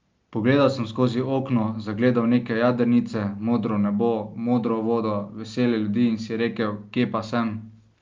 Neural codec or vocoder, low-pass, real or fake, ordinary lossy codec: none; 7.2 kHz; real; Opus, 24 kbps